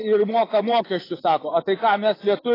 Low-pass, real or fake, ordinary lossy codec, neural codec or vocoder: 5.4 kHz; fake; AAC, 24 kbps; vocoder, 24 kHz, 100 mel bands, Vocos